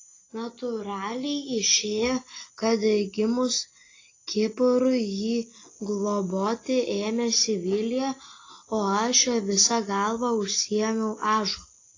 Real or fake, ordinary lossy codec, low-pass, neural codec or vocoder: real; AAC, 32 kbps; 7.2 kHz; none